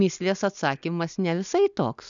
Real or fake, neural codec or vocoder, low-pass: real; none; 7.2 kHz